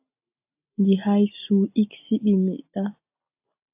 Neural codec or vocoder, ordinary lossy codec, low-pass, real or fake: none; AAC, 32 kbps; 3.6 kHz; real